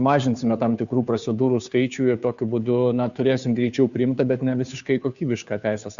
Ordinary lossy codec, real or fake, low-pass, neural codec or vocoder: MP3, 96 kbps; fake; 7.2 kHz; codec, 16 kHz, 2 kbps, FunCodec, trained on Chinese and English, 25 frames a second